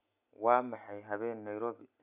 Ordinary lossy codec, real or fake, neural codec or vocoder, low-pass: none; real; none; 3.6 kHz